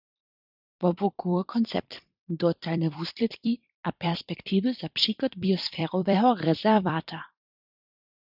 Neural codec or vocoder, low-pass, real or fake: vocoder, 44.1 kHz, 128 mel bands every 256 samples, BigVGAN v2; 5.4 kHz; fake